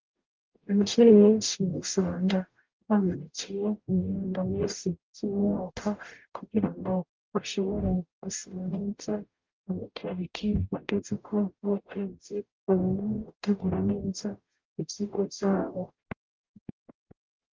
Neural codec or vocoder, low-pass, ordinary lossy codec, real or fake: codec, 44.1 kHz, 0.9 kbps, DAC; 7.2 kHz; Opus, 32 kbps; fake